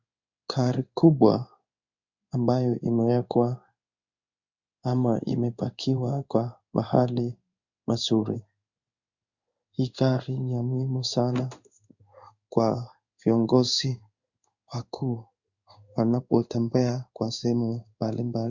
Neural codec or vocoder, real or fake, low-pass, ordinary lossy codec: codec, 16 kHz in and 24 kHz out, 1 kbps, XY-Tokenizer; fake; 7.2 kHz; Opus, 64 kbps